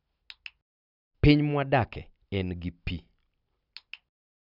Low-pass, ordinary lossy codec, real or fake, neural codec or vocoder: 5.4 kHz; none; real; none